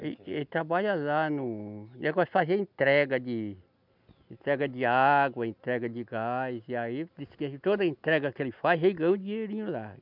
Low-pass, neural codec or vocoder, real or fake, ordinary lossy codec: 5.4 kHz; none; real; none